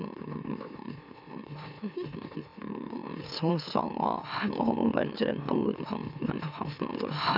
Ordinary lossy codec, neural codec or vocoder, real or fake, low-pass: none; autoencoder, 44.1 kHz, a latent of 192 numbers a frame, MeloTTS; fake; 5.4 kHz